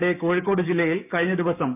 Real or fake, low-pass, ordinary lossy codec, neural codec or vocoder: fake; 3.6 kHz; none; codec, 16 kHz, 6 kbps, DAC